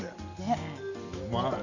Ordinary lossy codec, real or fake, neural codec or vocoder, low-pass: none; real; none; 7.2 kHz